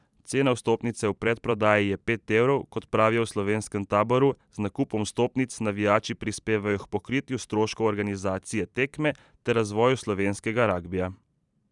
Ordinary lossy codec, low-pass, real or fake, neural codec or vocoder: none; 10.8 kHz; fake; vocoder, 44.1 kHz, 128 mel bands every 512 samples, BigVGAN v2